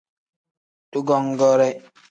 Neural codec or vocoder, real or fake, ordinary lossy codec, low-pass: none; real; AAC, 64 kbps; 9.9 kHz